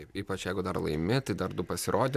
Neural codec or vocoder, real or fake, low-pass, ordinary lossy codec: none; real; 14.4 kHz; MP3, 96 kbps